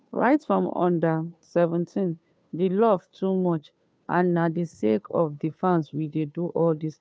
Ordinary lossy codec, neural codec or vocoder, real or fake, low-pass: none; codec, 16 kHz, 2 kbps, FunCodec, trained on Chinese and English, 25 frames a second; fake; none